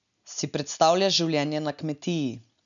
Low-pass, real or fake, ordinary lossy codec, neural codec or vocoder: 7.2 kHz; real; none; none